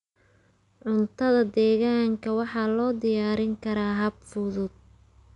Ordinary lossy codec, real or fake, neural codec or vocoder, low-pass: none; real; none; 10.8 kHz